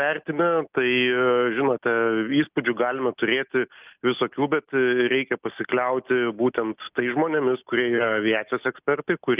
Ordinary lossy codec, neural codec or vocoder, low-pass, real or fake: Opus, 32 kbps; none; 3.6 kHz; real